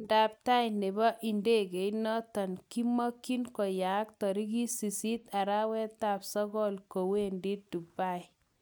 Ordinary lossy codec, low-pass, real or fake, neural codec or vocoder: none; none; real; none